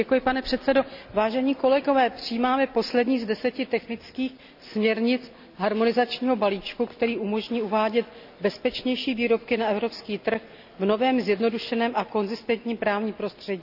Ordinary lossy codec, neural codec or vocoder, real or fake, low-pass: none; none; real; 5.4 kHz